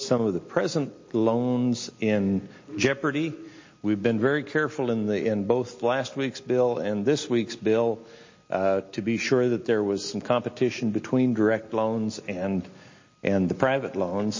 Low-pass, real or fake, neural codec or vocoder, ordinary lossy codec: 7.2 kHz; real; none; MP3, 32 kbps